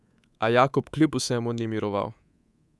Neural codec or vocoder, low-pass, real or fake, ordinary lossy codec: codec, 24 kHz, 3.1 kbps, DualCodec; none; fake; none